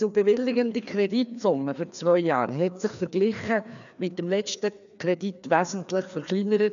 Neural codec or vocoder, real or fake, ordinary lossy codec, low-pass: codec, 16 kHz, 2 kbps, FreqCodec, larger model; fake; none; 7.2 kHz